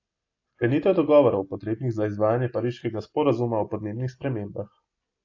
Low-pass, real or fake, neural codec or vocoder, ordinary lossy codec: 7.2 kHz; real; none; AAC, 48 kbps